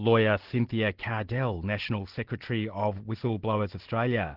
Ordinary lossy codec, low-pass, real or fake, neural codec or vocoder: Opus, 16 kbps; 5.4 kHz; real; none